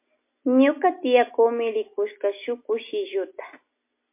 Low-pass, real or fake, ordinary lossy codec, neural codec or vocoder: 3.6 kHz; real; MP3, 24 kbps; none